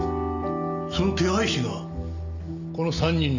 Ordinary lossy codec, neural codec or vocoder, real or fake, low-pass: none; none; real; 7.2 kHz